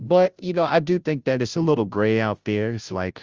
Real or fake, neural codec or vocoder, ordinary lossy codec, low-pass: fake; codec, 16 kHz, 0.5 kbps, FunCodec, trained on Chinese and English, 25 frames a second; Opus, 32 kbps; 7.2 kHz